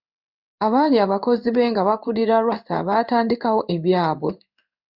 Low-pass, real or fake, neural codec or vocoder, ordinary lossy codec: 5.4 kHz; fake; codec, 16 kHz in and 24 kHz out, 1 kbps, XY-Tokenizer; Opus, 64 kbps